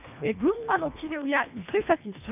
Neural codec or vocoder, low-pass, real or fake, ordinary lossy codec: codec, 24 kHz, 1.5 kbps, HILCodec; 3.6 kHz; fake; none